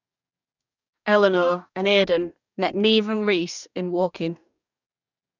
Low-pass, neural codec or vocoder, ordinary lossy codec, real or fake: 7.2 kHz; codec, 44.1 kHz, 2.6 kbps, DAC; none; fake